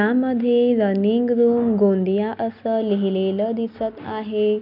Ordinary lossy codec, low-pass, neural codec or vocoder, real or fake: none; 5.4 kHz; none; real